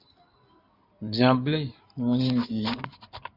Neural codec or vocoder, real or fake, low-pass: codec, 16 kHz in and 24 kHz out, 2.2 kbps, FireRedTTS-2 codec; fake; 5.4 kHz